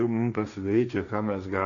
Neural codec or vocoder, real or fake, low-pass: codec, 16 kHz, 1.1 kbps, Voila-Tokenizer; fake; 7.2 kHz